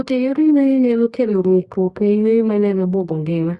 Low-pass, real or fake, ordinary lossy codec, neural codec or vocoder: 10.8 kHz; fake; Opus, 64 kbps; codec, 24 kHz, 0.9 kbps, WavTokenizer, medium music audio release